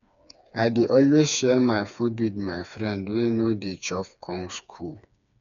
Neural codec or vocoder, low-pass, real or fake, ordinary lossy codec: codec, 16 kHz, 4 kbps, FreqCodec, smaller model; 7.2 kHz; fake; none